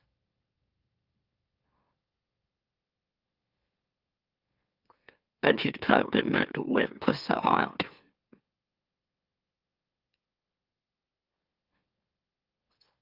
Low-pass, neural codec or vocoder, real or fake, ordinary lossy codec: 5.4 kHz; autoencoder, 44.1 kHz, a latent of 192 numbers a frame, MeloTTS; fake; Opus, 24 kbps